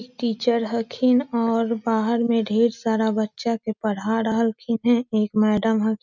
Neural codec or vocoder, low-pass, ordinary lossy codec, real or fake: none; 7.2 kHz; none; real